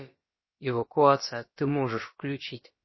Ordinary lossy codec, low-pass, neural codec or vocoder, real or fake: MP3, 24 kbps; 7.2 kHz; codec, 16 kHz, about 1 kbps, DyCAST, with the encoder's durations; fake